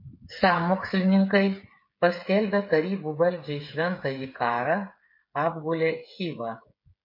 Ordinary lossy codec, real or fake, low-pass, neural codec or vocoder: MP3, 32 kbps; fake; 5.4 kHz; codec, 16 kHz, 8 kbps, FreqCodec, smaller model